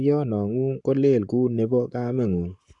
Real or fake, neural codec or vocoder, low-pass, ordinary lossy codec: fake; codec, 44.1 kHz, 7.8 kbps, Pupu-Codec; 10.8 kHz; MP3, 96 kbps